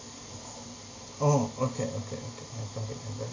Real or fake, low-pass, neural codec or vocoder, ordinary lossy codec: real; 7.2 kHz; none; AAC, 32 kbps